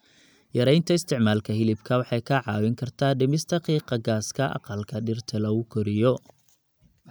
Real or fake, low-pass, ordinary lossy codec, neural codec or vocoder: real; none; none; none